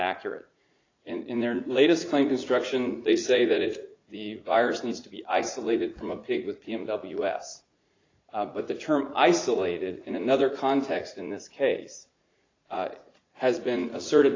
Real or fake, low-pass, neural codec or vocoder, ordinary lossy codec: fake; 7.2 kHz; vocoder, 44.1 kHz, 80 mel bands, Vocos; AAC, 32 kbps